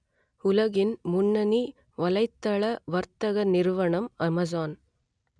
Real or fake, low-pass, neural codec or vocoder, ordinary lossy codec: real; 9.9 kHz; none; none